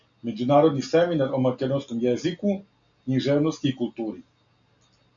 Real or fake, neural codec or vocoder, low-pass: real; none; 7.2 kHz